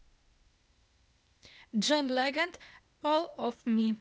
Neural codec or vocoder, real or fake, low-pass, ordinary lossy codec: codec, 16 kHz, 0.8 kbps, ZipCodec; fake; none; none